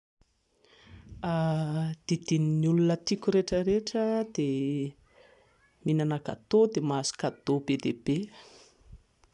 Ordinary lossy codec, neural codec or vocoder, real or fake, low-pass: none; none; real; 10.8 kHz